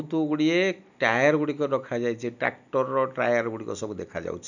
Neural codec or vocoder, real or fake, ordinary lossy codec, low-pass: none; real; none; 7.2 kHz